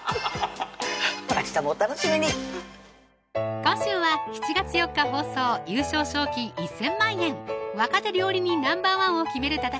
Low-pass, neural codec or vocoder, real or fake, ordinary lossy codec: none; none; real; none